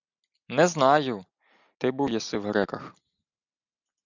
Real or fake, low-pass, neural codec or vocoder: real; 7.2 kHz; none